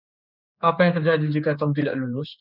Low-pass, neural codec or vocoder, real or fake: 5.4 kHz; codec, 16 kHz, 4 kbps, X-Codec, HuBERT features, trained on general audio; fake